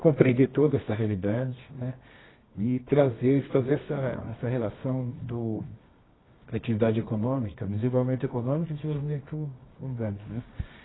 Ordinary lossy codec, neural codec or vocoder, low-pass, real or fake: AAC, 16 kbps; codec, 24 kHz, 0.9 kbps, WavTokenizer, medium music audio release; 7.2 kHz; fake